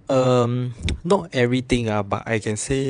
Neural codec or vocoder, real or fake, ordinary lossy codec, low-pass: vocoder, 22.05 kHz, 80 mel bands, WaveNeXt; fake; none; 9.9 kHz